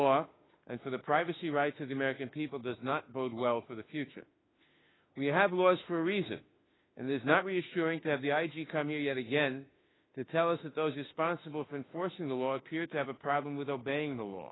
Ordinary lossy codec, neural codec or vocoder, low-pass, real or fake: AAC, 16 kbps; autoencoder, 48 kHz, 32 numbers a frame, DAC-VAE, trained on Japanese speech; 7.2 kHz; fake